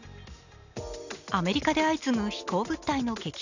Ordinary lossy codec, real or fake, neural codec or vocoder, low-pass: none; real; none; 7.2 kHz